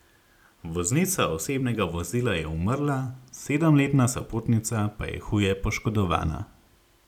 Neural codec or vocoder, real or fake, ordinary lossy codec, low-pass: none; real; none; 19.8 kHz